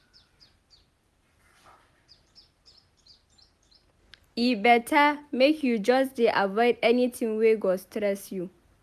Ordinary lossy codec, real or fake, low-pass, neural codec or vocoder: none; real; 14.4 kHz; none